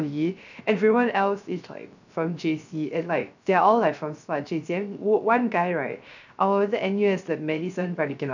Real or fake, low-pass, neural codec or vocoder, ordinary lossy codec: fake; 7.2 kHz; codec, 16 kHz, 0.3 kbps, FocalCodec; none